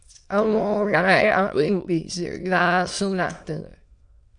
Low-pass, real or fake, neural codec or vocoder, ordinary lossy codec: 9.9 kHz; fake; autoencoder, 22.05 kHz, a latent of 192 numbers a frame, VITS, trained on many speakers; MP3, 64 kbps